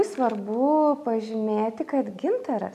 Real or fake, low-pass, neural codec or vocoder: real; 14.4 kHz; none